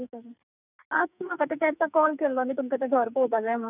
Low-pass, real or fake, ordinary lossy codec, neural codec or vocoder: 3.6 kHz; fake; none; codec, 44.1 kHz, 2.6 kbps, SNAC